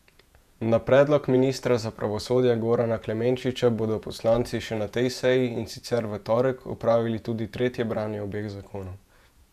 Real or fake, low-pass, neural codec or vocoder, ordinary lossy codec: fake; 14.4 kHz; vocoder, 48 kHz, 128 mel bands, Vocos; none